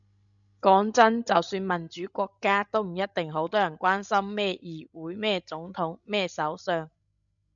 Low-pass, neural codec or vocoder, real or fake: 7.2 kHz; codec, 16 kHz, 16 kbps, FreqCodec, larger model; fake